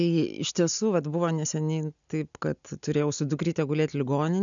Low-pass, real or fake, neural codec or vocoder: 7.2 kHz; real; none